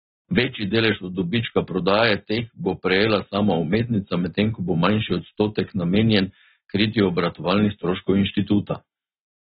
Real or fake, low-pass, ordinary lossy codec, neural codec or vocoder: real; 7.2 kHz; AAC, 16 kbps; none